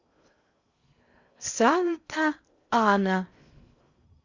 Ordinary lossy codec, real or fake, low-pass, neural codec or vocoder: Opus, 64 kbps; fake; 7.2 kHz; codec, 16 kHz in and 24 kHz out, 0.6 kbps, FocalCodec, streaming, 2048 codes